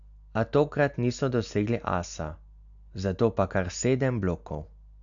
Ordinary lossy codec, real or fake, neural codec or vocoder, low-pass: none; real; none; 7.2 kHz